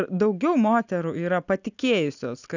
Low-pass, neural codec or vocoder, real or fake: 7.2 kHz; none; real